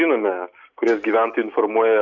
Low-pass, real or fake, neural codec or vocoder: 7.2 kHz; real; none